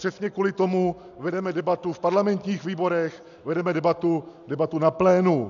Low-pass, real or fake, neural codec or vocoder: 7.2 kHz; real; none